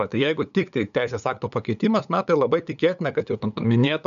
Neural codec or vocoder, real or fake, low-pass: codec, 16 kHz, 8 kbps, FunCodec, trained on LibriTTS, 25 frames a second; fake; 7.2 kHz